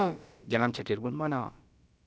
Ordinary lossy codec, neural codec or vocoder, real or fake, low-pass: none; codec, 16 kHz, about 1 kbps, DyCAST, with the encoder's durations; fake; none